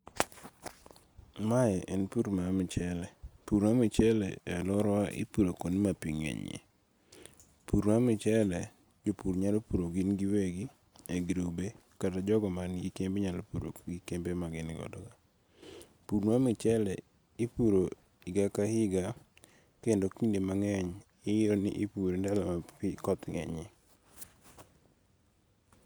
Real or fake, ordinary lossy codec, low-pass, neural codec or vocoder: real; none; none; none